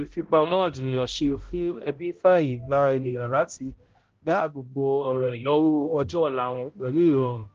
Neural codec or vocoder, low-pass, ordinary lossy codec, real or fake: codec, 16 kHz, 0.5 kbps, X-Codec, HuBERT features, trained on balanced general audio; 7.2 kHz; Opus, 16 kbps; fake